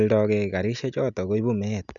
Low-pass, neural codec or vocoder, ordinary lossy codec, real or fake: 7.2 kHz; none; none; real